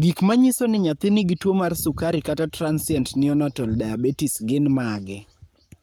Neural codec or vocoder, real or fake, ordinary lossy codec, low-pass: codec, 44.1 kHz, 7.8 kbps, Pupu-Codec; fake; none; none